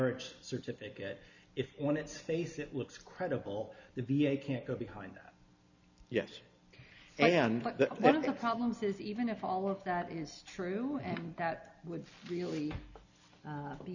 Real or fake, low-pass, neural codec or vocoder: real; 7.2 kHz; none